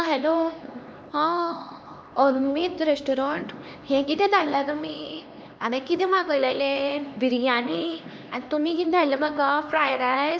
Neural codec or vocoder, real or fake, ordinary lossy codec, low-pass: codec, 16 kHz, 2 kbps, X-Codec, HuBERT features, trained on LibriSpeech; fake; none; none